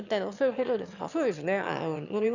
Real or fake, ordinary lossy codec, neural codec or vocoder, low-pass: fake; none; autoencoder, 22.05 kHz, a latent of 192 numbers a frame, VITS, trained on one speaker; 7.2 kHz